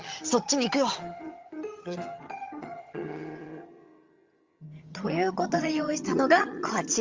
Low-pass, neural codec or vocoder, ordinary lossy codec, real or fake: 7.2 kHz; vocoder, 22.05 kHz, 80 mel bands, HiFi-GAN; Opus, 32 kbps; fake